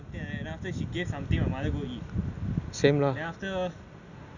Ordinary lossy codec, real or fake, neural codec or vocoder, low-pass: none; real; none; 7.2 kHz